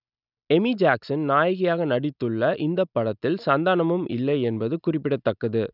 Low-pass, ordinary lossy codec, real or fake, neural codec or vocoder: 5.4 kHz; none; real; none